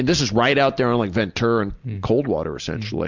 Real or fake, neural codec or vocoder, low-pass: real; none; 7.2 kHz